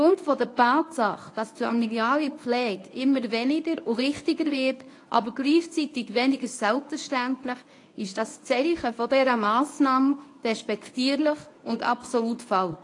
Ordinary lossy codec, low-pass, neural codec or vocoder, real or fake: AAC, 48 kbps; 10.8 kHz; codec, 24 kHz, 0.9 kbps, WavTokenizer, medium speech release version 1; fake